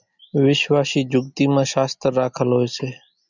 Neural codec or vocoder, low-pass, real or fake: none; 7.2 kHz; real